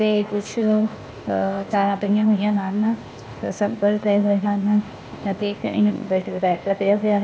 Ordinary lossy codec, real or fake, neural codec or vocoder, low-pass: none; fake; codec, 16 kHz, 0.8 kbps, ZipCodec; none